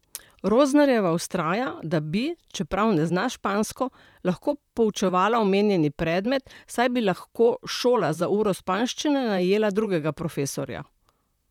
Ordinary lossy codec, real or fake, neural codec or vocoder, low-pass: none; fake; vocoder, 44.1 kHz, 128 mel bands, Pupu-Vocoder; 19.8 kHz